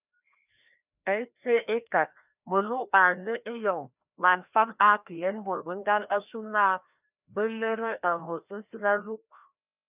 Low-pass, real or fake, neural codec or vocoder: 3.6 kHz; fake; codec, 16 kHz, 1 kbps, FreqCodec, larger model